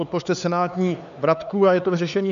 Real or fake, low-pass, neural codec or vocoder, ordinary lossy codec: fake; 7.2 kHz; codec, 16 kHz, 4 kbps, X-Codec, HuBERT features, trained on LibriSpeech; MP3, 96 kbps